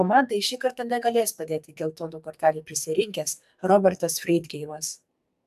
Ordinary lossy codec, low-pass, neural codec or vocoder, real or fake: AAC, 96 kbps; 14.4 kHz; codec, 44.1 kHz, 2.6 kbps, SNAC; fake